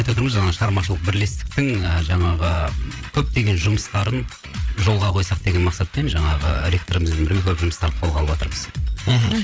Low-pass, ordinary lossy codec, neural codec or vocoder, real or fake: none; none; codec, 16 kHz, 8 kbps, FreqCodec, larger model; fake